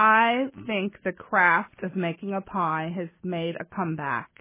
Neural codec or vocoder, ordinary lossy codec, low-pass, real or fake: none; MP3, 16 kbps; 3.6 kHz; real